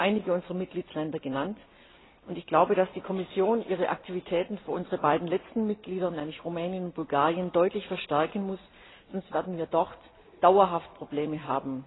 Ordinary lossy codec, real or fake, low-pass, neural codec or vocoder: AAC, 16 kbps; real; 7.2 kHz; none